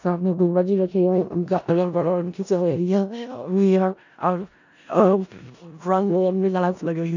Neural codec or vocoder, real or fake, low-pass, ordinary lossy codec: codec, 16 kHz in and 24 kHz out, 0.4 kbps, LongCat-Audio-Codec, four codebook decoder; fake; 7.2 kHz; none